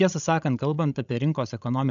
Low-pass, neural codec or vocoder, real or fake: 7.2 kHz; codec, 16 kHz, 16 kbps, FreqCodec, larger model; fake